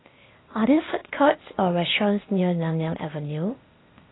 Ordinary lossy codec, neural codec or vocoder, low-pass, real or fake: AAC, 16 kbps; codec, 16 kHz, 0.8 kbps, ZipCodec; 7.2 kHz; fake